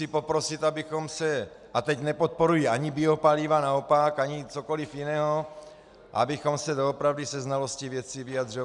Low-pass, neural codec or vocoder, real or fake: 10.8 kHz; none; real